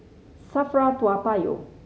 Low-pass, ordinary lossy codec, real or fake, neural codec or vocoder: none; none; real; none